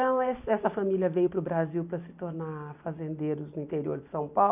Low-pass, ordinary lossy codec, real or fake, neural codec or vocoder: 3.6 kHz; none; real; none